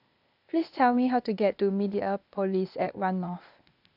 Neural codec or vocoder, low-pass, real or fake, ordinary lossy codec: codec, 16 kHz, 0.8 kbps, ZipCodec; 5.4 kHz; fake; none